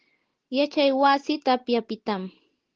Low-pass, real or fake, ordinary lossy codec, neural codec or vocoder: 7.2 kHz; real; Opus, 16 kbps; none